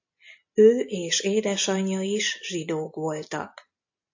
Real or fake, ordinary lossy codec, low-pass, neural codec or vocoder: real; AAC, 48 kbps; 7.2 kHz; none